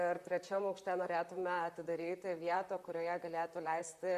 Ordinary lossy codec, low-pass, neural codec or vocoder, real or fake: Opus, 64 kbps; 14.4 kHz; vocoder, 44.1 kHz, 128 mel bands, Pupu-Vocoder; fake